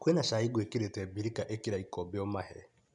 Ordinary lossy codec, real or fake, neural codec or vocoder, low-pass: none; real; none; 10.8 kHz